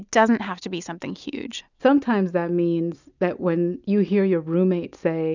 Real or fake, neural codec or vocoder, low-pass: real; none; 7.2 kHz